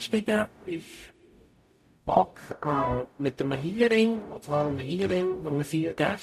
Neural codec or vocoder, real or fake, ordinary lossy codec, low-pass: codec, 44.1 kHz, 0.9 kbps, DAC; fake; none; 14.4 kHz